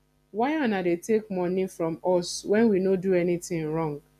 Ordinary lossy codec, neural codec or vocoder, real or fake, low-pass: none; none; real; 14.4 kHz